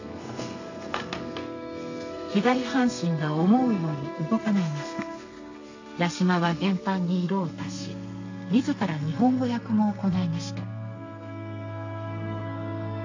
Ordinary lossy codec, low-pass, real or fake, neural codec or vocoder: AAC, 48 kbps; 7.2 kHz; fake; codec, 32 kHz, 1.9 kbps, SNAC